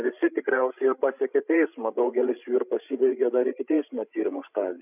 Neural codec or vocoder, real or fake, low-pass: codec, 16 kHz, 16 kbps, FreqCodec, larger model; fake; 3.6 kHz